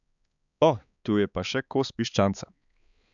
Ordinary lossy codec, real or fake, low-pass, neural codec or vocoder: none; fake; 7.2 kHz; codec, 16 kHz, 4 kbps, X-Codec, HuBERT features, trained on balanced general audio